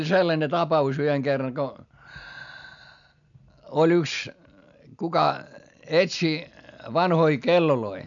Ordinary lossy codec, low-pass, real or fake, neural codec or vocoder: none; 7.2 kHz; real; none